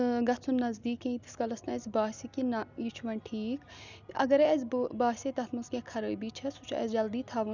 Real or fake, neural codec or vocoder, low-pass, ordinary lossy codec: real; none; 7.2 kHz; none